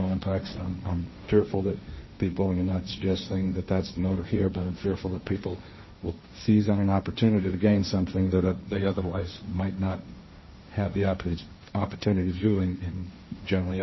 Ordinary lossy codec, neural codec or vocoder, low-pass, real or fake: MP3, 24 kbps; codec, 16 kHz, 1.1 kbps, Voila-Tokenizer; 7.2 kHz; fake